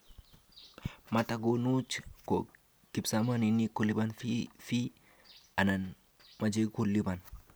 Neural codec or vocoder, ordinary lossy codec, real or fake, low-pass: none; none; real; none